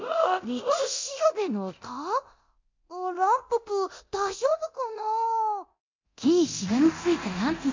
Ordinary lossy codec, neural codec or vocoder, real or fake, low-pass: MP3, 64 kbps; codec, 24 kHz, 0.9 kbps, DualCodec; fake; 7.2 kHz